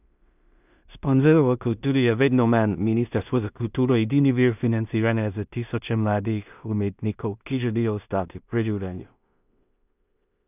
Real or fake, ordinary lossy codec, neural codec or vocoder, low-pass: fake; none; codec, 16 kHz in and 24 kHz out, 0.4 kbps, LongCat-Audio-Codec, two codebook decoder; 3.6 kHz